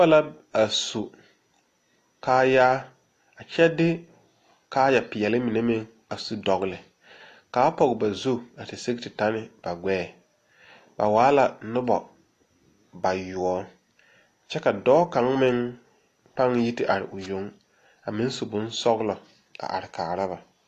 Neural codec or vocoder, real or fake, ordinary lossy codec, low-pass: none; real; AAC, 48 kbps; 14.4 kHz